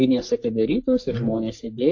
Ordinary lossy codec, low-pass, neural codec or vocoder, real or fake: AAC, 48 kbps; 7.2 kHz; codec, 44.1 kHz, 3.4 kbps, Pupu-Codec; fake